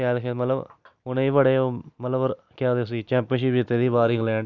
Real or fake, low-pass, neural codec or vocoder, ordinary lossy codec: real; 7.2 kHz; none; none